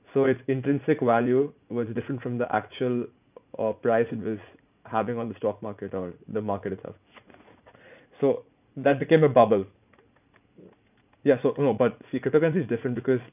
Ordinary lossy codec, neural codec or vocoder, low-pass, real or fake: none; vocoder, 44.1 kHz, 80 mel bands, Vocos; 3.6 kHz; fake